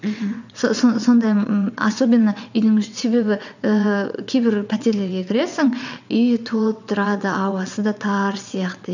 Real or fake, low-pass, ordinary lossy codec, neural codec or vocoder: fake; 7.2 kHz; none; vocoder, 22.05 kHz, 80 mel bands, WaveNeXt